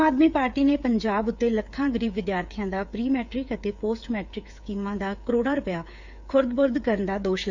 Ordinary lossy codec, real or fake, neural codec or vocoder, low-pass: none; fake; codec, 16 kHz, 8 kbps, FreqCodec, smaller model; 7.2 kHz